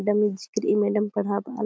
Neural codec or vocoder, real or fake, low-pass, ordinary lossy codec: none; real; none; none